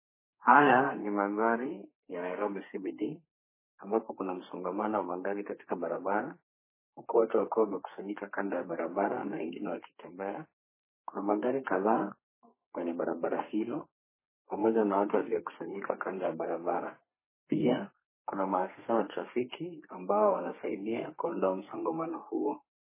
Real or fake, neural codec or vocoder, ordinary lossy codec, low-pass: fake; codec, 32 kHz, 1.9 kbps, SNAC; MP3, 16 kbps; 3.6 kHz